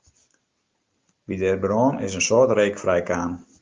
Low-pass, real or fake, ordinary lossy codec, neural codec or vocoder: 7.2 kHz; real; Opus, 32 kbps; none